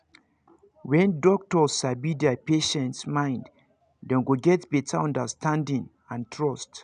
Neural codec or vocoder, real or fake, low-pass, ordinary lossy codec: none; real; 9.9 kHz; none